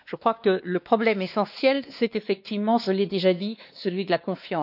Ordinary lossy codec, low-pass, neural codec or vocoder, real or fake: none; 5.4 kHz; codec, 16 kHz, 2 kbps, X-Codec, WavLM features, trained on Multilingual LibriSpeech; fake